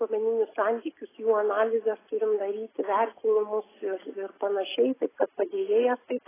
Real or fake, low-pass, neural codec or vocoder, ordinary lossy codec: real; 3.6 kHz; none; AAC, 16 kbps